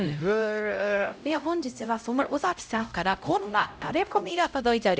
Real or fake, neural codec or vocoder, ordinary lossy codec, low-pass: fake; codec, 16 kHz, 0.5 kbps, X-Codec, HuBERT features, trained on LibriSpeech; none; none